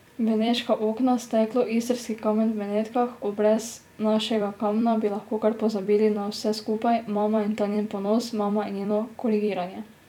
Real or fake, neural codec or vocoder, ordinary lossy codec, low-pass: fake; vocoder, 44.1 kHz, 128 mel bands every 256 samples, BigVGAN v2; none; 19.8 kHz